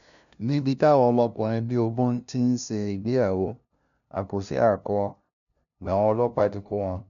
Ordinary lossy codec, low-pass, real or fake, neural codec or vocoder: none; 7.2 kHz; fake; codec, 16 kHz, 0.5 kbps, FunCodec, trained on LibriTTS, 25 frames a second